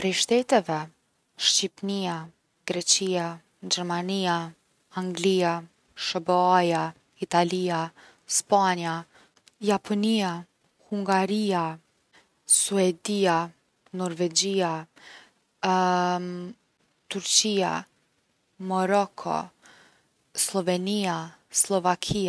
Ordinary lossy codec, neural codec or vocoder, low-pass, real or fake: none; none; none; real